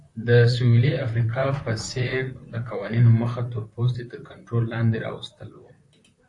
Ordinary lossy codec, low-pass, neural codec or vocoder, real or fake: AAC, 48 kbps; 10.8 kHz; vocoder, 44.1 kHz, 128 mel bands, Pupu-Vocoder; fake